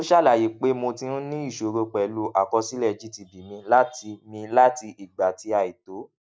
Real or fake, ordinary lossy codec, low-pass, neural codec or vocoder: real; none; none; none